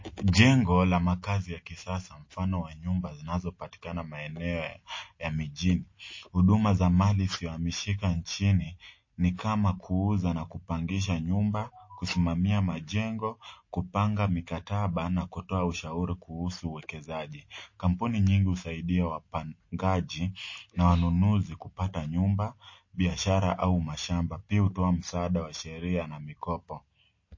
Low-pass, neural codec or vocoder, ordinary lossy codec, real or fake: 7.2 kHz; none; MP3, 32 kbps; real